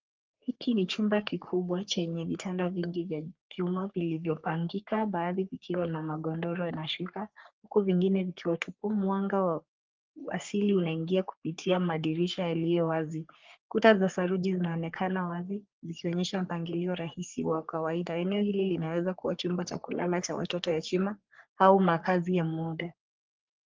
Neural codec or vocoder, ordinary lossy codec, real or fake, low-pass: codec, 44.1 kHz, 3.4 kbps, Pupu-Codec; Opus, 32 kbps; fake; 7.2 kHz